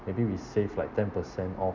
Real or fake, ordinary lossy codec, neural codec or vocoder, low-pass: real; none; none; 7.2 kHz